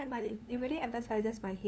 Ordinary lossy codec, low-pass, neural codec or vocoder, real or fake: none; none; codec, 16 kHz, 2 kbps, FunCodec, trained on LibriTTS, 25 frames a second; fake